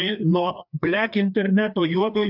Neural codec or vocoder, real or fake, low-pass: codec, 16 kHz, 2 kbps, FreqCodec, larger model; fake; 5.4 kHz